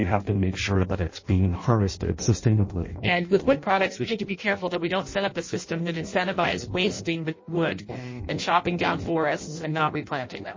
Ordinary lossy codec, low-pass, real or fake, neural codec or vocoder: MP3, 32 kbps; 7.2 kHz; fake; codec, 16 kHz in and 24 kHz out, 0.6 kbps, FireRedTTS-2 codec